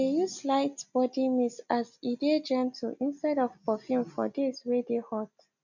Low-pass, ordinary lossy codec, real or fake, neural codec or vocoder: 7.2 kHz; none; real; none